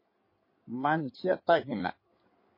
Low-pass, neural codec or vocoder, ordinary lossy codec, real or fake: 5.4 kHz; codec, 16 kHz in and 24 kHz out, 2.2 kbps, FireRedTTS-2 codec; MP3, 24 kbps; fake